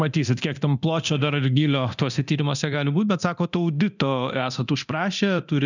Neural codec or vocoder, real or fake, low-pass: codec, 24 kHz, 0.9 kbps, DualCodec; fake; 7.2 kHz